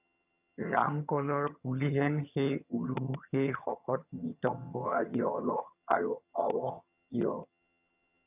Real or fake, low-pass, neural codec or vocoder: fake; 3.6 kHz; vocoder, 22.05 kHz, 80 mel bands, HiFi-GAN